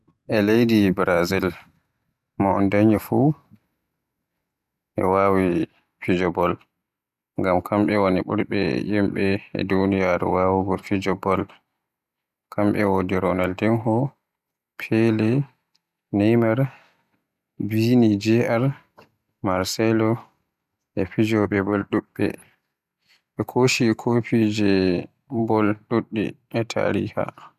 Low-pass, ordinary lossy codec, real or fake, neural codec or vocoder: 14.4 kHz; none; real; none